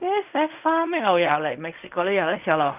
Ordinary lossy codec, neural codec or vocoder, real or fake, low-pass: none; codec, 16 kHz in and 24 kHz out, 0.4 kbps, LongCat-Audio-Codec, fine tuned four codebook decoder; fake; 3.6 kHz